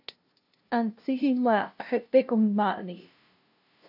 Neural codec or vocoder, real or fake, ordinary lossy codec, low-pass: codec, 16 kHz, 0.5 kbps, FunCodec, trained on LibriTTS, 25 frames a second; fake; AAC, 48 kbps; 5.4 kHz